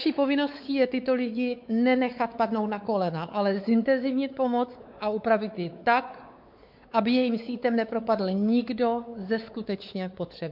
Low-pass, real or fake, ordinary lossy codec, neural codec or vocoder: 5.4 kHz; fake; MP3, 48 kbps; codec, 16 kHz, 4 kbps, X-Codec, WavLM features, trained on Multilingual LibriSpeech